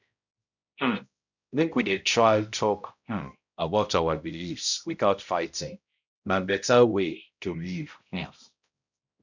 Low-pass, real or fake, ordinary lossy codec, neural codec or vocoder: 7.2 kHz; fake; none; codec, 16 kHz, 0.5 kbps, X-Codec, HuBERT features, trained on balanced general audio